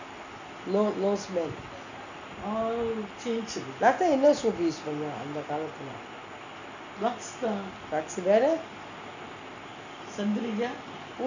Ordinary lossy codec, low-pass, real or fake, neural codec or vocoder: none; 7.2 kHz; real; none